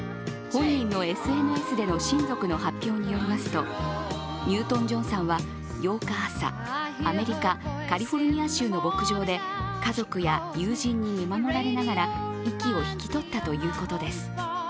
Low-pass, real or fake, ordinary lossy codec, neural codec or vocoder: none; real; none; none